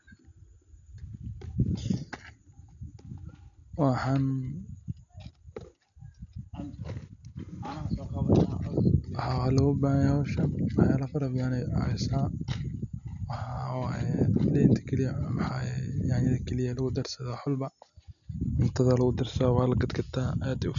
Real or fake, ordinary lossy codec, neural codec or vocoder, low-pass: real; none; none; 7.2 kHz